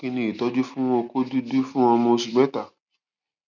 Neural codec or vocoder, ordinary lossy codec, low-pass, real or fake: none; none; 7.2 kHz; real